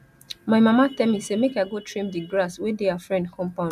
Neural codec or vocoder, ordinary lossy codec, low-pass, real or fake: none; none; 14.4 kHz; real